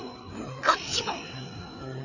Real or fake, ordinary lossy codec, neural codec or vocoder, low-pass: fake; none; codec, 16 kHz, 4 kbps, FreqCodec, larger model; 7.2 kHz